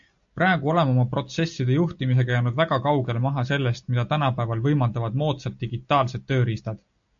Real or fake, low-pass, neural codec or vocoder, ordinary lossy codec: real; 7.2 kHz; none; AAC, 64 kbps